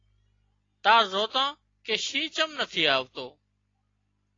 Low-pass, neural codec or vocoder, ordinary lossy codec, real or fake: 7.2 kHz; none; AAC, 32 kbps; real